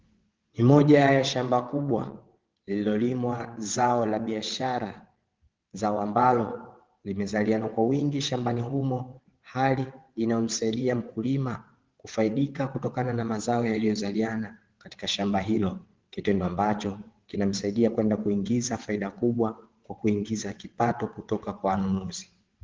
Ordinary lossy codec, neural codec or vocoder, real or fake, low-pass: Opus, 16 kbps; vocoder, 22.05 kHz, 80 mel bands, WaveNeXt; fake; 7.2 kHz